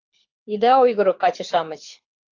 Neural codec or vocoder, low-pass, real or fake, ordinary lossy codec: codec, 24 kHz, 6 kbps, HILCodec; 7.2 kHz; fake; AAC, 48 kbps